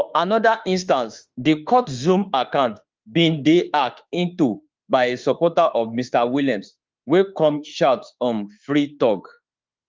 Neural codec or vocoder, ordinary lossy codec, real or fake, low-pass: autoencoder, 48 kHz, 32 numbers a frame, DAC-VAE, trained on Japanese speech; Opus, 32 kbps; fake; 7.2 kHz